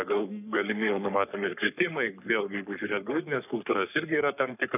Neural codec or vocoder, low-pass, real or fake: codec, 44.1 kHz, 3.4 kbps, Pupu-Codec; 3.6 kHz; fake